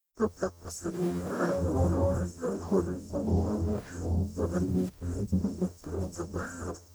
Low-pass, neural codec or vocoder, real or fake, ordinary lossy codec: none; codec, 44.1 kHz, 0.9 kbps, DAC; fake; none